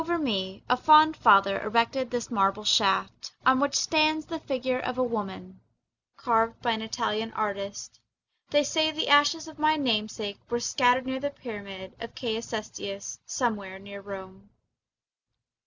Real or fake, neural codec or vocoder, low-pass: real; none; 7.2 kHz